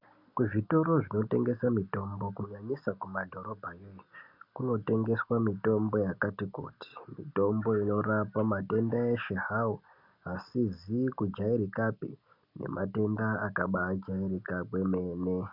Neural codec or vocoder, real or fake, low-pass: none; real; 5.4 kHz